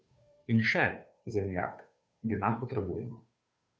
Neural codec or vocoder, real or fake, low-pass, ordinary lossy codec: codec, 16 kHz, 2 kbps, FunCodec, trained on Chinese and English, 25 frames a second; fake; none; none